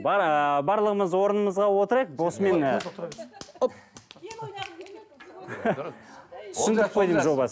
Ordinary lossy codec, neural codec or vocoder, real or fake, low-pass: none; none; real; none